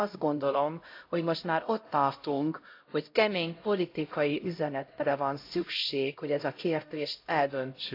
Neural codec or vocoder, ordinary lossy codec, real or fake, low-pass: codec, 16 kHz, 0.5 kbps, X-Codec, HuBERT features, trained on LibriSpeech; AAC, 32 kbps; fake; 5.4 kHz